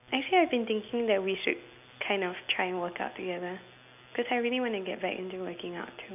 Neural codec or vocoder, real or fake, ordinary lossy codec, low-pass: none; real; none; 3.6 kHz